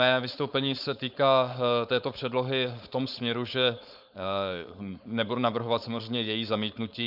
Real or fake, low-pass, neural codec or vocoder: fake; 5.4 kHz; codec, 16 kHz, 4.8 kbps, FACodec